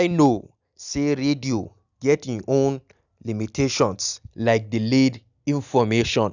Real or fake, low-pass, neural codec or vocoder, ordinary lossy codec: real; 7.2 kHz; none; none